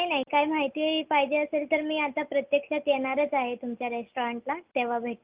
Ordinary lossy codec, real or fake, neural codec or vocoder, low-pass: Opus, 24 kbps; real; none; 3.6 kHz